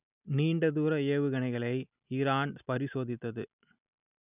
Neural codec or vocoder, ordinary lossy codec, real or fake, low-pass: none; none; real; 3.6 kHz